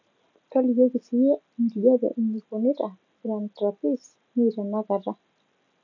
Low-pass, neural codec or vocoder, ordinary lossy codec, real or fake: 7.2 kHz; none; none; real